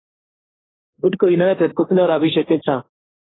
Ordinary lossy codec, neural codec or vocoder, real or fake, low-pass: AAC, 16 kbps; codec, 16 kHz, 1.1 kbps, Voila-Tokenizer; fake; 7.2 kHz